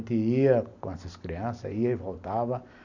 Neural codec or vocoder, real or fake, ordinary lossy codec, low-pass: none; real; none; 7.2 kHz